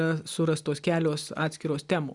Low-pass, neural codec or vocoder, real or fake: 10.8 kHz; none; real